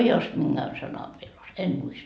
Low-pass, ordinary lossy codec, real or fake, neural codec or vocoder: none; none; real; none